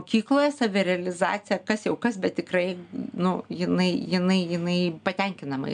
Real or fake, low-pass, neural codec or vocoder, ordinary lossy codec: real; 9.9 kHz; none; MP3, 96 kbps